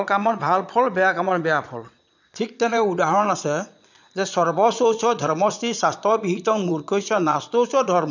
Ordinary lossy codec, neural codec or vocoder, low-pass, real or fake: none; vocoder, 22.05 kHz, 80 mel bands, Vocos; 7.2 kHz; fake